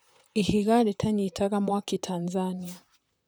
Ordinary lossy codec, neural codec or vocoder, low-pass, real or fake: none; vocoder, 44.1 kHz, 128 mel bands, Pupu-Vocoder; none; fake